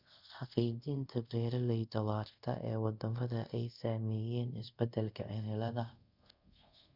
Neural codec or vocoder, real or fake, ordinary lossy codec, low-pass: codec, 24 kHz, 0.5 kbps, DualCodec; fake; Opus, 64 kbps; 5.4 kHz